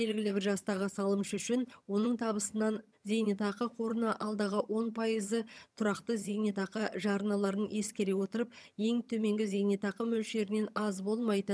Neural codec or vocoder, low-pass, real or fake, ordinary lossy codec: vocoder, 22.05 kHz, 80 mel bands, HiFi-GAN; none; fake; none